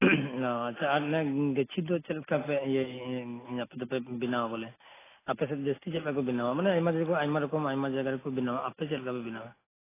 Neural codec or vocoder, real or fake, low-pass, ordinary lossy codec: none; real; 3.6 kHz; AAC, 16 kbps